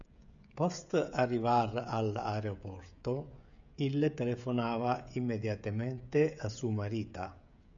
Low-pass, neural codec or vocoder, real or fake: 7.2 kHz; codec, 16 kHz, 16 kbps, FreqCodec, smaller model; fake